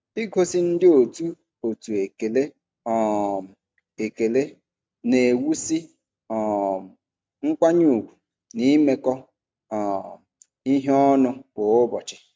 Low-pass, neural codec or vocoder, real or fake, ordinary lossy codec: none; none; real; none